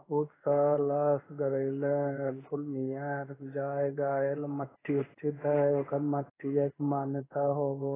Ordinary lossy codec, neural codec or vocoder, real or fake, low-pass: AAC, 16 kbps; codec, 16 kHz in and 24 kHz out, 1 kbps, XY-Tokenizer; fake; 3.6 kHz